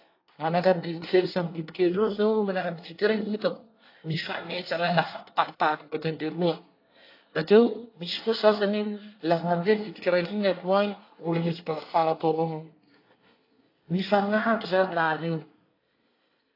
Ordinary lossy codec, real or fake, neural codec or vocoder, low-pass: AAC, 32 kbps; fake; codec, 24 kHz, 1 kbps, SNAC; 5.4 kHz